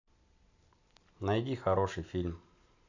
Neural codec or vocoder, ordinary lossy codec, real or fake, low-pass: none; none; real; 7.2 kHz